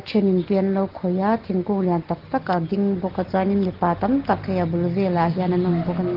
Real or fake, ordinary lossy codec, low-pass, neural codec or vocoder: real; Opus, 16 kbps; 5.4 kHz; none